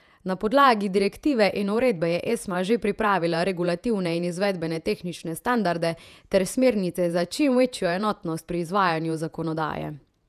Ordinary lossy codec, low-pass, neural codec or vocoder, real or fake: none; 14.4 kHz; vocoder, 48 kHz, 128 mel bands, Vocos; fake